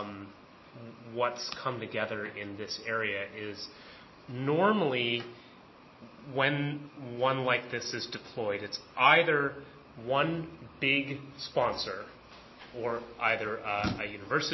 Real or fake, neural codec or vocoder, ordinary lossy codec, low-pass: real; none; MP3, 24 kbps; 7.2 kHz